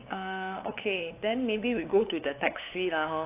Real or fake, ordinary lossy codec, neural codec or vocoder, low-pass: fake; AAC, 24 kbps; codec, 16 kHz, 8 kbps, FunCodec, trained on LibriTTS, 25 frames a second; 3.6 kHz